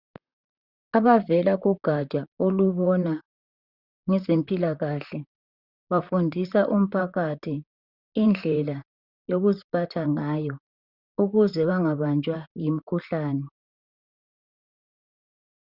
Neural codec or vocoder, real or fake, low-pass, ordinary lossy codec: vocoder, 44.1 kHz, 128 mel bands, Pupu-Vocoder; fake; 5.4 kHz; Opus, 64 kbps